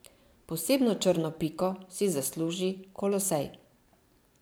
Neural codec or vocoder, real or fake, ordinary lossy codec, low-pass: vocoder, 44.1 kHz, 128 mel bands every 512 samples, BigVGAN v2; fake; none; none